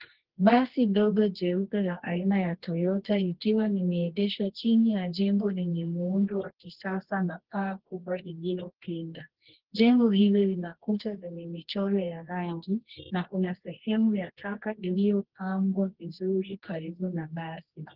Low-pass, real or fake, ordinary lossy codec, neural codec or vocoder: 5.4 kHz; fake; Opus, 16 kbps; codec, 24 kHz, 0.9 kbps, WavTokenizer, medium music audio release